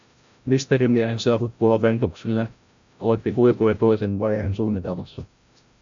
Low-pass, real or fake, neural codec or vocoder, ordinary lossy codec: 7.2 kHz; fake; codec, 16 kHz, 0.5 kbps, FreqCodec, larger model; AAC, 64 kbps